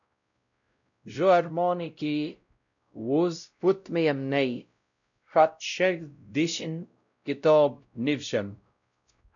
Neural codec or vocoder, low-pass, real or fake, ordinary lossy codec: codec, 16 kHz, 0.5 kbps, X-Codec, WavLM features, trained on Multilingual LibriSpeech; 7.2 kHz; fake; MP3, 96 kbps